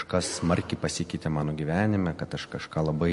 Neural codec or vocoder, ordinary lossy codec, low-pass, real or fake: none; MP3, 48 kbps; 14.4 kHz; real